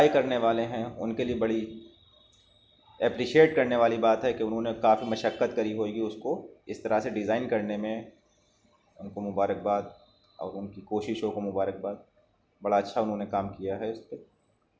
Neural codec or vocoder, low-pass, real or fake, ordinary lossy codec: none; none; real; none